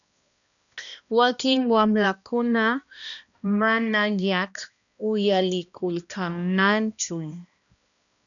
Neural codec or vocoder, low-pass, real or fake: codec, 16 kHz, 1 kbps, X-Codec, HuBERT features, trained on balanced general audio; 7.2 kHz; fake